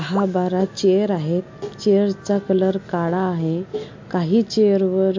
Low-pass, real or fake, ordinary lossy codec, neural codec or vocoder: 7.2 kHz; real; MP3, 48 kbps; none